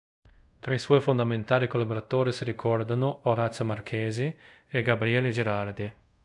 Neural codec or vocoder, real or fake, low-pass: codec, 24 kHz, 0.5 kbps, DualCodec; fake; 10.8 kHz